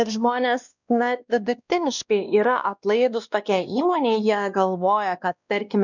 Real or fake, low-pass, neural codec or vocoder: fake; 7.2 kHz; codec, 16 kHz, 1 kbps, X-Codec, WavLM features, trained on Multilingual LibriSpeech